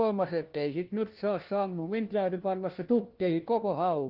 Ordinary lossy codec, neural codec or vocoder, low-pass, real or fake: Opus, 16 kbps; codec, 16 kHz, 1 kbps, FunCodec, trained on LibriTTS, 50 frames a second; 5.4 kHz; fake